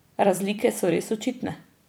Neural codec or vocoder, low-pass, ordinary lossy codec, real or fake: vocoder, 44.1 kHz, 128 mel bands every 256 samples, BigVGAN v2; none; none; fake